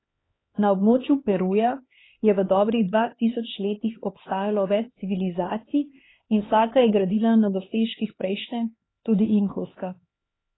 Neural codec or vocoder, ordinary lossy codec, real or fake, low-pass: codec, 16 kHz, 2 kbps, X-Codec, HuBERT features, trained on LibriSpeech; AAC, 16 kbps; fake; 7.2 kHz